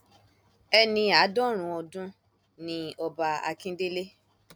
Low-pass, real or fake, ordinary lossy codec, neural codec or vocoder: none; real; none; none